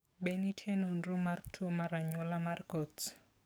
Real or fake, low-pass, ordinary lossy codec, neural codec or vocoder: fake; none; none; codec, 44.1 kHz, 7.8 kbps, DAC